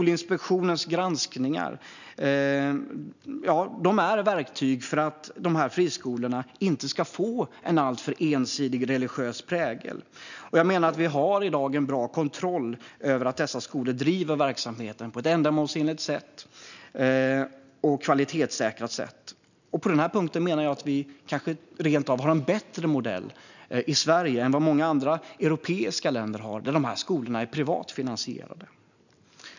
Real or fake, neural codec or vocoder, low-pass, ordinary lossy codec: real; none; 7.2 kHz; none